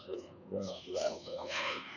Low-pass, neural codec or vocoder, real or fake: 7.2 kHz; codec, 24 kHz, 1.2 kbps, DualCodec; fake